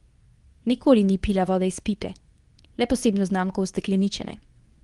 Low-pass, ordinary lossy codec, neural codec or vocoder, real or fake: 10.8 kHz; Opus, 24 kbps; codec, 24 kHz, 0.9 kbps, WavTokenizer, medium speech release version 2; fake